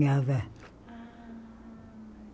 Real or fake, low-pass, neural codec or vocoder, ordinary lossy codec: real; none; none; none